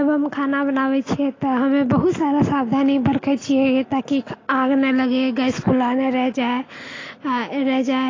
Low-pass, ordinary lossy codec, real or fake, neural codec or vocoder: 7.2 kHz; AAC, 32 kbps; real; none